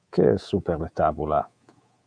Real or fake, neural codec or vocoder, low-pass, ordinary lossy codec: fake; codec, 24 kHz, 3.1 kbps, DualCodec; 9.9 kHz; Opus, 64 kbps